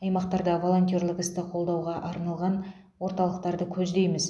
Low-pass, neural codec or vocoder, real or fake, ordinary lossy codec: none; none; real; none